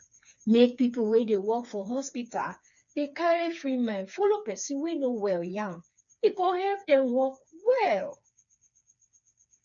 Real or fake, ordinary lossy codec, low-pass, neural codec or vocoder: fake; none; 7.2 kHz; codec, 16 kHz, 4 kbps, FreqCodec, smaller model